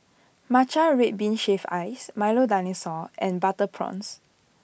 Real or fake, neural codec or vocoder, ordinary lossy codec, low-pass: real; none; none; none